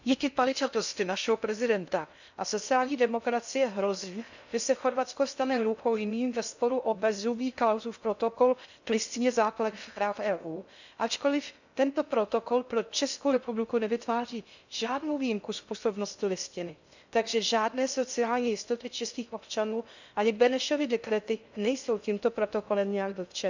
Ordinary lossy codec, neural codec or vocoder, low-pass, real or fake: none; codec, 16 kHz in and 24 kHz out, 0.6 kbps, FocalCodec, streaming, 2048 codes; 7.2 kHz; fake